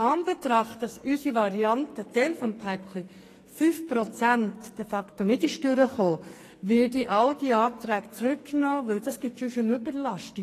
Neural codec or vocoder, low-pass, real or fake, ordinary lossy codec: codec, 44.1 kHz, 2.6 kbps, SNAC; 14.4 kHz; fake; AAC, 48 kbps